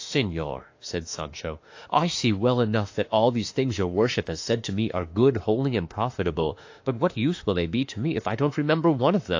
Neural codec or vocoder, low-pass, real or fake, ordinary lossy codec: autoencoder, 48 kHz, 32 numbers a frame, DAC-VAE, trained on Japanese speech; 7.2 kHz; fake; AAC, 48 kbps